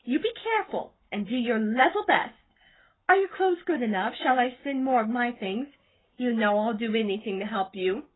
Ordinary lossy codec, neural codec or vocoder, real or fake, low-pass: AAC, 16 kbps; codec, 44.1 kHz, 7.8 kbps, Pupu-Codec; fake; 7.2 kHz